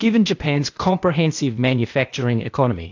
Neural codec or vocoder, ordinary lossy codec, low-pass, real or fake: codec, 16 kHz, 0.8 kbps, ZipCodec; AAC, 48 kbps; 7.2 kHz; fake